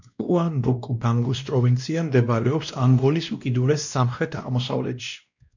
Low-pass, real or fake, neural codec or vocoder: 7.2 kHz; fake; codec, 16 kHz, 1 kbps, X-Codec, WavLM features, trained on Multilingual LibriSpeech